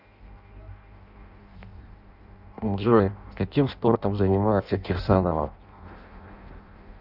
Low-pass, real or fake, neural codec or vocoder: 5.4 kHz; fake; codec, 16 kHz in and 24 kHz out, 0.6 kbps, FireRedTTS-2 codec